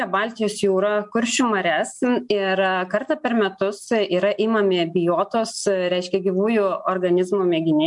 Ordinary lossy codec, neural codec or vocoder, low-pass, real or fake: MP3, 64 kbps; none; 10.8 kHz; real